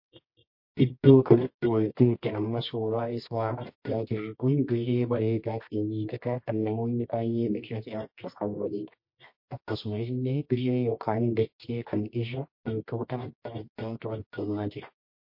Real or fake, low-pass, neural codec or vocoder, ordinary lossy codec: fake; 5.4 kHz; codec, 24 kHz, 0.9 kbps, WavTokenizer, medium music audio release; MP3, 32 kbps